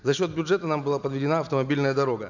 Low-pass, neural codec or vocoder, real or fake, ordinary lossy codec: 7.2 kHz; none; real; none